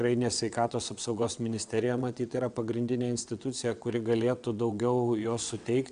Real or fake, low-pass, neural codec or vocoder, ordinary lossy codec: fake; 9.9 kHz; vocoder, 22.05 kHz, 80 mel bands, WaveNeXt; Opus, 64 kbps